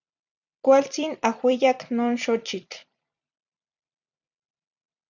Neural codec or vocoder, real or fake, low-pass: vocoder, 44.1 kHz, 128 mel bands every 256 samples, BigVGAN v2; fake; 7.2 kHz